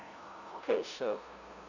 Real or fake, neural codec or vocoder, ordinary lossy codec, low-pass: fake; codec, 16 kHz, 0.5 kbps, FunCodec, trained on LibriTTS, 25 frames a second; none; 7.2 kHz